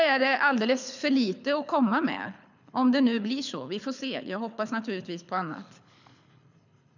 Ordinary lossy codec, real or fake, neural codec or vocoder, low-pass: none; fake; codec, 24 kHz, 6 kbps, HILCodec; 7.2 kHz